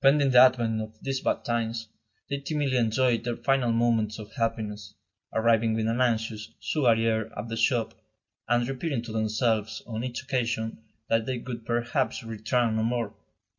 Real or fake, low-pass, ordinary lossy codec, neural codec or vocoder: real; 7.2 kHz; MP3, 48 kbps; none